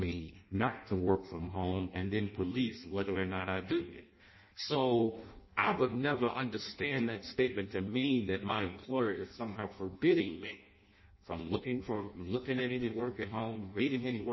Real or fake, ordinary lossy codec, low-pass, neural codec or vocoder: fake; MP3, 24 kbps; 7.2 kHz; codec, 16 kHz in and 24 kHz out, 0.6 kbps, FireRedTTS-2 codec